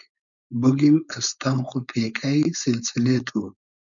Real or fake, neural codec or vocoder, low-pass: fake; codec, 16 kHz, 4.8 kbps, FACodec; 7.2 kHz